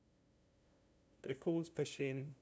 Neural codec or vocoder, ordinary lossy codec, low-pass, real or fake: codec, 16 kHz, 0.5 kbps, FunCodec, trained on LibriTTS, 25 frames a second; none; none; fake